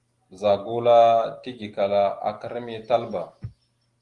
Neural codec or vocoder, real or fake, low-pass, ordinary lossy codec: none; real; 10.8 kHz; Opus, 24 kbps